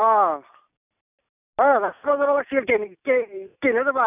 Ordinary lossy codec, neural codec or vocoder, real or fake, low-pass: none; none; real; 3.6 kHz